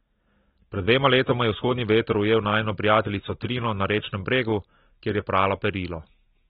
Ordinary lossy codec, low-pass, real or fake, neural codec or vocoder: AAC, 16 kbps; 7.2 kHz; real; none